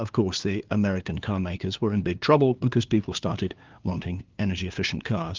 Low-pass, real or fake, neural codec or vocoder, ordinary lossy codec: 7.2 kHz; fake; codec, 16 kHz, 2 kbps, FunCodec, trained on LibriTTS, 25 frames a second; Opus, 32 kbps